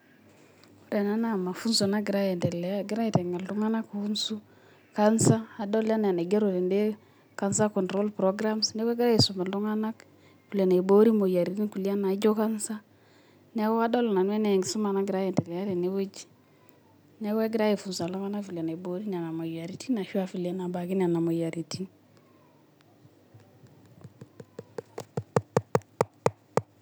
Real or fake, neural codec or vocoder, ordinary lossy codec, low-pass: real; none; none; none